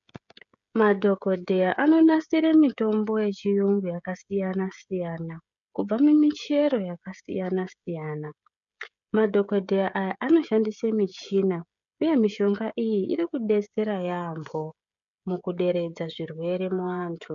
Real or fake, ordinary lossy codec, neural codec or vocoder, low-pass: fake; MP3, 96 kbps; codec, 16 kHz, 16 kbps, FreqCodec, smaller model; 7.2 kHz